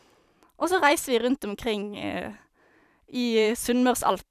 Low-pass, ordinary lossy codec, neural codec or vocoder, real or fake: 14.4 kHz; none; vocoder, 44.1 kHz, 128 mel bands every 512 samples, BigVGAN v2; fake